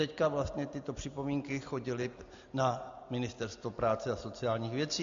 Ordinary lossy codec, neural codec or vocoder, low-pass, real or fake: MP3, 64 kbps; none; 7.2 kHz; real